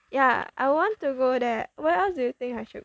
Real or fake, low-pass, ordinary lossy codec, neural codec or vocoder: real; none; none; none